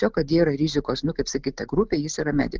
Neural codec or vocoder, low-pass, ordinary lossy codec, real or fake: none; 7.2 kHz; Opus, 32 kbps; real